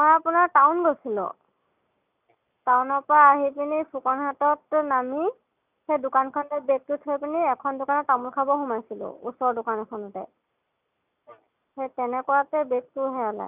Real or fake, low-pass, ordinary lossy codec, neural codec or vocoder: real; 3.6 kHz; none; none